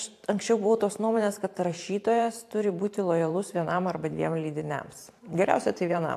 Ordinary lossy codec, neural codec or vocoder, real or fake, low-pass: AAC, 64 kbps; vocoder, 44.1 kHz, 128 mel bands every 512 samples, BigVGAN v2; fake; 14.4 kHz